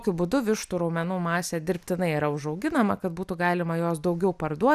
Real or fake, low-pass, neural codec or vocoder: real; 14.4 kHz; none